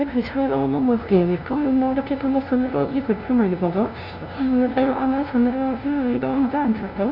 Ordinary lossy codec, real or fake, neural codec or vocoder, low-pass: none; fake; codec, 16 kHz, 0.5 kbps, FunCodec, trained on LibriTTS, 25 frames a second; 5.4 kHz